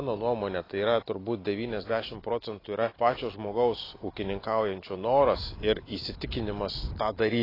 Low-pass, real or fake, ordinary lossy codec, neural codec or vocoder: 5.4 kHz; real; AAC, 24 kbps; none